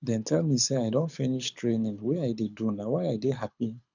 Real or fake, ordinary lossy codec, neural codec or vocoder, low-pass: fake; none; codec, 24 kHz, 6 kbps, HILCodec; 7.2 kHz